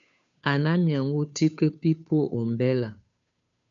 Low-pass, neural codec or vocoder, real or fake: 7.2 kHz; codec, 16 kHz, 2 kbps, FunCodec, trained on Chinese and English, 25 frames a second; fake